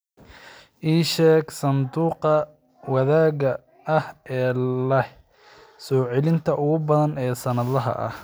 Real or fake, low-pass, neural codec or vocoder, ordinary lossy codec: real; none; none; none